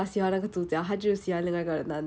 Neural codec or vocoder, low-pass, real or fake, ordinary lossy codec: none; none; real; none